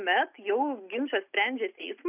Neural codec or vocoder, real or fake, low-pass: none; real; 3.6 kHz